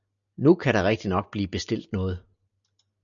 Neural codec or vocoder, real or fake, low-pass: none; real; 7.2 kHz